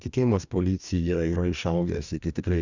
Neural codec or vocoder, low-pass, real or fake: codec, 44.1 kHz, 2.6 kbps, DAC; 7.2 kHz; fake